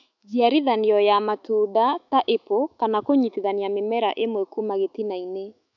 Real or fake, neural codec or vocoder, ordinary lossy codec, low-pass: fake; autoencoder, 48 kHz, 128 numbers a frame, DAC-VAE, trained on Japanese speech; none; 7.2 kHz